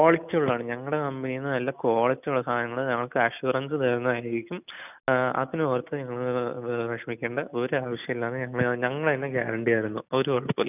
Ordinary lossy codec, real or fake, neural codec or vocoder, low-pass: none; real; none; 3.6 kHz